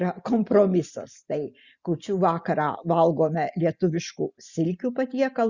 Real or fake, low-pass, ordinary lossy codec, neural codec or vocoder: real; 7.2 kHz; Opus, 64 kbps; none